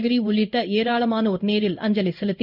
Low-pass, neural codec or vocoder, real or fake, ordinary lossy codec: 5.4 kHz; codec, 16 kHz in and 24 kHz out, 1 kbps, XY-Tokenizer; fake; none